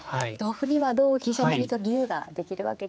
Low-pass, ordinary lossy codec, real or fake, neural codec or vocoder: none; none; fake; codec, 16 kHz, 4 kbps, X-Codec, HuBERT features, trained on general audio